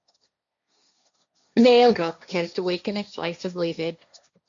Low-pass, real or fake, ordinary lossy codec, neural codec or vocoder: 7.2 kHz; fake; MP3, 64 kbps; codec, 16 kHz, 1.1 kbps, Voila-Tokenizer